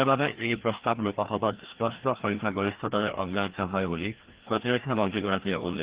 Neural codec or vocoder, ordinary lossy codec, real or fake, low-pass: codec, 16 kHz, 1 kbps, FreqCodec, larger model; Opus, 16 kbps; fake; 3.6 kHz